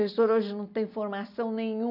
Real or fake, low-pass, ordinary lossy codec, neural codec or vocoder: real; 5.4 kHz; none; none